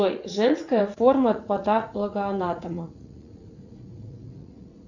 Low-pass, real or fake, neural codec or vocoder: 7.2 kHz; fake; vocoder, 44.1 kHz, 80 mel bands, Vocos